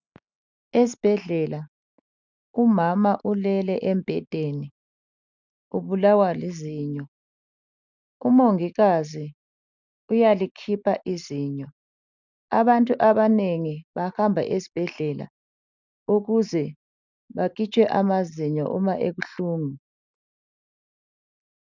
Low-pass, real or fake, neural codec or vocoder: 7.2 kHz; real; none